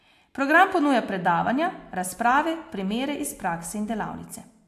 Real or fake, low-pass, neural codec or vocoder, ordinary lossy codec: real; 14.4 kHz; none; AAC, 64 kbps